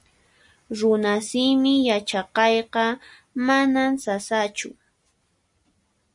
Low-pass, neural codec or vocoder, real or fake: 10.8 kHz; none; real